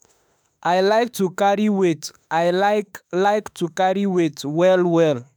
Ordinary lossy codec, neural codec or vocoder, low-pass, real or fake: none; autoencoder, 48 kHz, 32 numbers a frame, DAC-VAE, trained on Japanese speech; none; fake